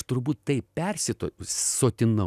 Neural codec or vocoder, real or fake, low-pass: none; real; 14.4 kHz